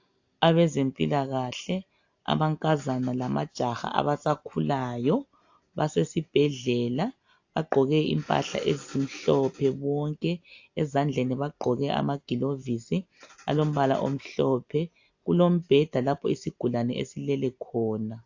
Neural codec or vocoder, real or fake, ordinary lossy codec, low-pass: none; real; AAC, 48 kbps; 7.2 kHz